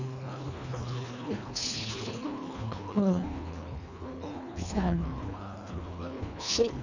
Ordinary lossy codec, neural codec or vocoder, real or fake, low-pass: none; codec, 24 kHz, 1.5 kbps, HILCodec; fake; 7.2 kHz